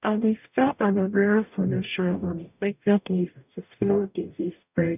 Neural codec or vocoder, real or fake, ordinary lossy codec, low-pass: codec, 44.1 kHz, 0.9 kbps, DAC; fake; none; 3.6 kHz